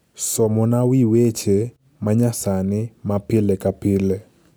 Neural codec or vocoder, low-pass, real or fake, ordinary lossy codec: none; none; real; none